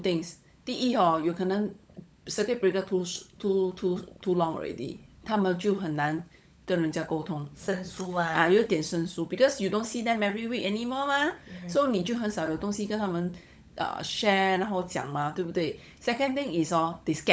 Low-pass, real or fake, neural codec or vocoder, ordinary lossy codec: none; fake; codec, 16 kHz, 16 kbps, FunCodec, trained on LibriTTS, 50 frames a second; none